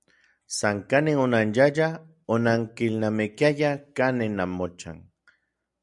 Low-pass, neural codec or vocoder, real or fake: 10.8 kHz; none; real